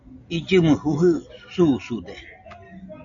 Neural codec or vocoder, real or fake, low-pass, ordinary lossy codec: none; real; 7.2 kHz; AAC, 64 kbps